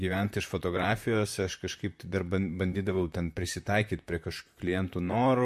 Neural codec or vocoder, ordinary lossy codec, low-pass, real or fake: vocoder, 44.1 kHz, 128 mel bands, Pupu-Vocoder; MP3, 64 kbps; 14.4 kHz; fake